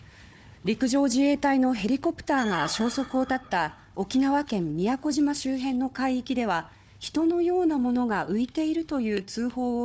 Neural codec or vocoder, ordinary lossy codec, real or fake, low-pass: codec, 16 kHz, 4 kbps, FunCodec, trained on Chinese and English, 50 frames a second; none; fake; none